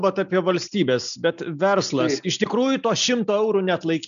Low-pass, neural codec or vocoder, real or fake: 7.2 kHz; none; real